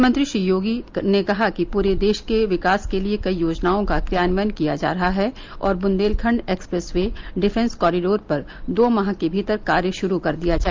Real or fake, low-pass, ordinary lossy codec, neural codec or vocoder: real; 7.2 kHz; Opus, 32 kbps; none